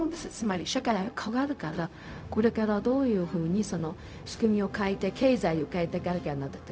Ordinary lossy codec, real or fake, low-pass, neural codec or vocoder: none; fake; none; codec, 16 kHz, 0.4 kbps, LongCat-Audio-Codec